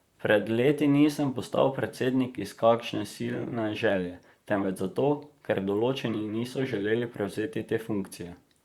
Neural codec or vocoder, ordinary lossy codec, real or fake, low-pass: vocoder, 44.1 kHz, 128 mel bands, Pupu-Vocoder; Opus, 64 kbps; fake; 19.8 kHz